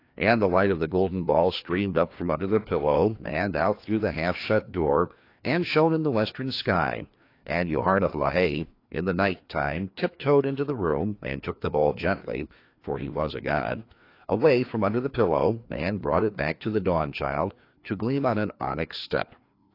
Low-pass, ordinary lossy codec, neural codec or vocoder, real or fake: 5.4 kHz; AAC, 32 kbps; codec, 16 kHz, 2 kbps, FreqCodec, larger model; fake